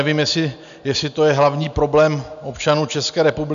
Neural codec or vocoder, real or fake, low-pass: none; real; 7.2 kHz